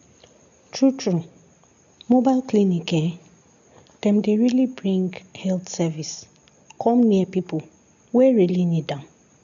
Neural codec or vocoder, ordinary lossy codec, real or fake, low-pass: none; none; real; 7.2 kHz